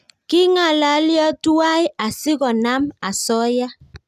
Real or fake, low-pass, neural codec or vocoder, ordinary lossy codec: real; 14.4 kHz; none; none